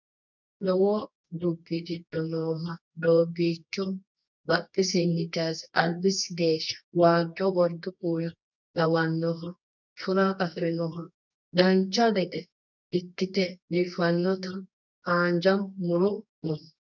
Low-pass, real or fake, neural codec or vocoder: 7.2 kHz; fake; codec, 24 kHz, 0.9 kbps, WavTokenizer, medium music audio release